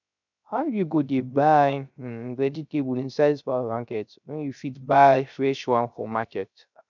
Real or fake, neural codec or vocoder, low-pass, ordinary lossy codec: fake; codec, 16 kHz, 0.3 kbps, FocalCodec; 7.2 kHz; none